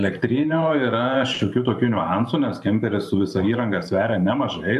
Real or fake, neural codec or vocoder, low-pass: fake; vocoder, 44.1 kHz, 128 mel bands every 512 samples, BigVGAN v2; 14.4 kHz